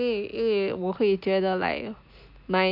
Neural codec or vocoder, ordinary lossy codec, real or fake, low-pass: none; MP3, 48 kbps; real; 5.4 kHz